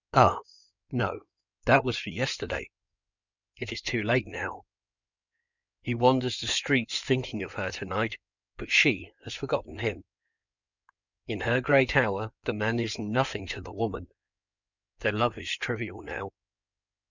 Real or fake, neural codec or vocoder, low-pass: fake; codec, 16 kHz in and 24 kHz out, 2.2 kbps, FireRedTTS-2 codec; 7.2 kHz